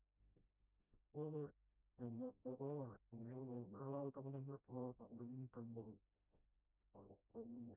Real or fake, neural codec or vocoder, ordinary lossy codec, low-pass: fake; codec, 16 kHz, 0.5 kbps, FreqCodec, smaller model; none; 3.6 kHz